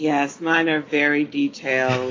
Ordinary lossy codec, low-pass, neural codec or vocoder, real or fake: AAC, 32 kbps; 7.2 kHz; none; real